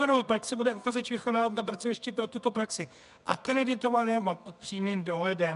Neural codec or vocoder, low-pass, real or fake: codec, 24 kHz, 0.9 kbps, WavTokenizer, medium music audio release; 10.8 kHz; fake